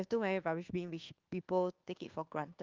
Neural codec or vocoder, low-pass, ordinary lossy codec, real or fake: codec, 24 kHz, 1.2 kbps, DualCodec; 7.2 kHz; Opus, 32 kbps; fake